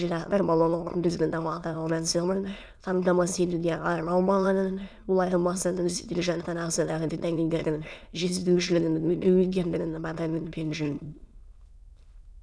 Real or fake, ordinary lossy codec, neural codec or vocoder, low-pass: fake; none; autoencoder, 22.05 kHz, a latent of 192 numbers a frame, VITS, trained on many speakers; none